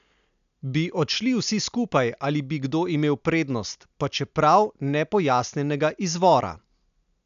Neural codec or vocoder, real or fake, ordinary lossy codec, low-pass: none; real; none; 7.2 kHz